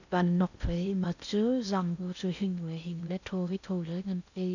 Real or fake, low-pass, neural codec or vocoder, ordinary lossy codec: fake; 7.2 kHz; codec, 16 kHz in and 24 kHz out, 0.6 kbps, FocalCodec, streaming, 4096 codes; none